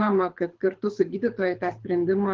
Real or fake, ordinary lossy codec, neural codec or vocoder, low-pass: fake; Opus, 16 kbps; codec, 24 kHz, 6 kbps, HILCodec; 7.2 kHz